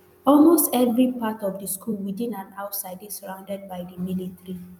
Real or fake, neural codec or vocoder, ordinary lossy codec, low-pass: fake; vocoder, 44.1 kHz, 128 mel bands every 256 samples, BigVGAN v2; none; 19.8 kHz